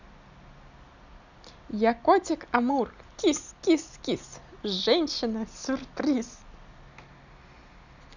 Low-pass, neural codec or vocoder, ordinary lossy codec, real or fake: 7.2 kHz; none; none; real